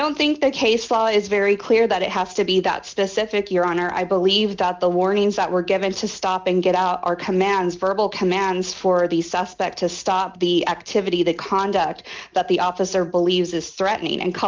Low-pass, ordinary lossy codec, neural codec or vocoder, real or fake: 7.2 kHz; Opus, 24 kbps; none; real